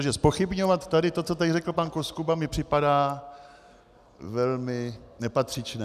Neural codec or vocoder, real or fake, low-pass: none; real; 14.4 kHz